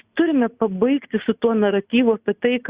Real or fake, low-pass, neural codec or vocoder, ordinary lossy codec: real; 3.6 kHz; none; Opus, 64 kbps